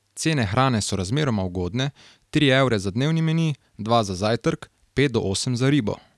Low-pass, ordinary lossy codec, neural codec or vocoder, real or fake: none; none; none; real